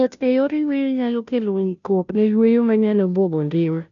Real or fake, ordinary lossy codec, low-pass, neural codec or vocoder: fake; none; 7.2 kHz; codec, 16 kHz, 0.5 kbps, FunCodec, trained on Chinese and English, 25 frames a second